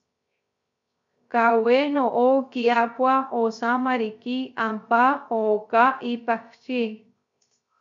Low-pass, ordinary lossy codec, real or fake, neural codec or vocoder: 7.2 kHz; MP3, 48 kbps; fake; codec, 16 kHz, 0.3 kbps, FocalCodec